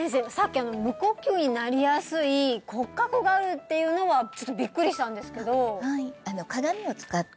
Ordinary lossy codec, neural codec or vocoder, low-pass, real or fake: none; none; none; real